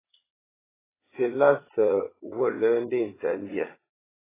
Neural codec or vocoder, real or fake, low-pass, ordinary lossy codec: vocoder, 22.05 kHz, 80 mel bands, Vocos; fake; 3.6 kHz; AAC, 16 kbps